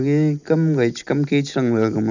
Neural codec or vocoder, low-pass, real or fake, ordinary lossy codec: none; 7.2 kHz; real; none